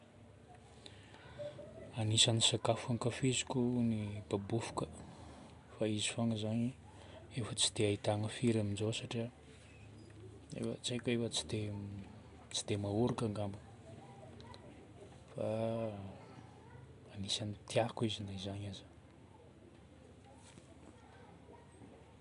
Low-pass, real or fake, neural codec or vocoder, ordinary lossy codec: 10.8 kHz; real; none; none